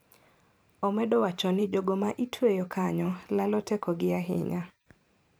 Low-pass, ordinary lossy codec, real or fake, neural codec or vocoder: none; none; fake; vocoder, 44.1 kHz, 128 mel bands every 512 samples, BigVGAN v2